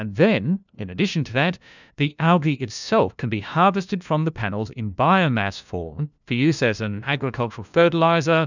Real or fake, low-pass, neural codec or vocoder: fake; 7.2 kHz; codec, 16 kHz, 1 kbps, FunCodec, trained on LibriTTS, 50 frames a second